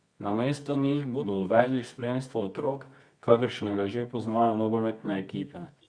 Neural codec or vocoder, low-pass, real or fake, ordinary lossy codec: codec, 24 kHz, 0.9 kbps, WavTokenizer, medium music audio release; 9.9 kHz; fake; Opus, 64 kbps